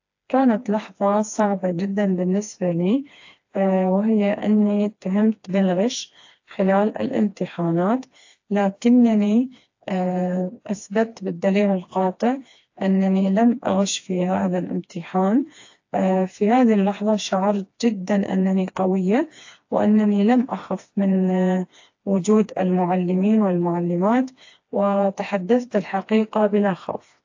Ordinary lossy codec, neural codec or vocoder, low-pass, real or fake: AAC, 48 kbps; codec, 16 kHz, 2 kbps, FreqCodec, smaller model; 7.2 kHz; fake